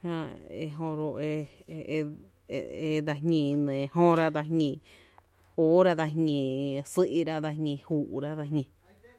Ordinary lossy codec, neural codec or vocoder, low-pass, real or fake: MP3, 64 kbps; autoencoder, 48 kHz, 128 numbers a frame, DAC-VAE, trained on Japanese speech; 19.8 kHz; fake